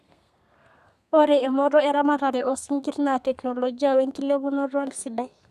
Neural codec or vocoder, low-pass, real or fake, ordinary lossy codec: codec, 32 kHz, 1.9 kbps, SNAC; 14.4 kHz; fake; none